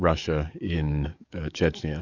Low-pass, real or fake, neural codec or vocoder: 7.2 kHz; fake; codec, 44.1 kHz, 7.8 kbps, DAC